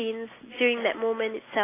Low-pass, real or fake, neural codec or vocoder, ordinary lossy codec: 3.6 kHz; real; none; AAC, 16 kbps